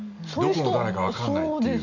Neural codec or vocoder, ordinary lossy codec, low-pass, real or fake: none; none; 7.2 kHz; real